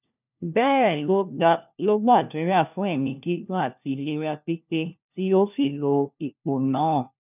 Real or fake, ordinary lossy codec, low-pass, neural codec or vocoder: fake; none; 3.6 kHz; codec, 16 kHz, 1 kbps, FunCodec, trained on LibriTTS, 50 frames a second